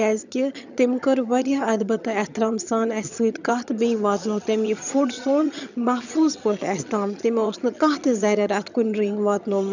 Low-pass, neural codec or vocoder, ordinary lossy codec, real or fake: 7.2 kHz; vocoder, 22.05 kHz, 80 mel bands, HiFi-GAN; none; fake